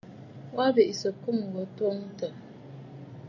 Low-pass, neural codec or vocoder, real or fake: 7.2 kHz; none; real